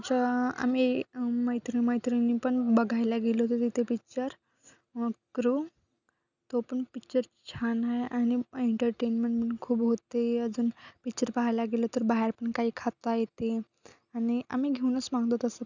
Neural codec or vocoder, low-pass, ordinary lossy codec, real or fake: none; 7.2 kHz; none; real